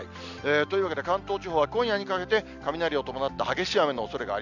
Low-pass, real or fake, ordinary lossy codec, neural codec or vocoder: 7.2 kHz; real; none; none